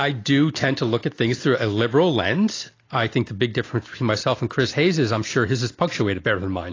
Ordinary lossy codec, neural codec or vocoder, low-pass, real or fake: AAC, 32 kbps; none; 7.2 kHz; real